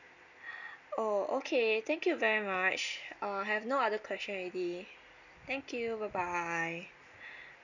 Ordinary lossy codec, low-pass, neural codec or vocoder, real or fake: none; 7.2 kHz; none; real